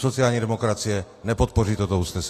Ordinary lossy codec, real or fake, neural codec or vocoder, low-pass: AAC, 48 kbps; real; none; 14.4 kHz